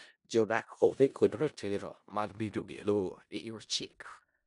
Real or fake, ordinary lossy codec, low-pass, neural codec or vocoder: fake; none; 10.8 kHz; codec, 16 kHz in and 24 kHz out, 0.4 kbps, LongCat-Audio-Codec, four codebook decoder